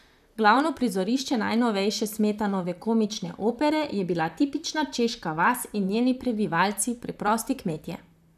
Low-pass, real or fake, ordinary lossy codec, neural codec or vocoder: 14.4 kHz; fake; none; vocoder, 44.1 kHz, 128 mel bands, Pupu-Vocoder